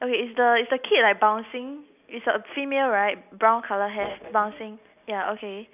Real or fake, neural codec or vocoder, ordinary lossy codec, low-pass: real; none; none; 3.6 kHz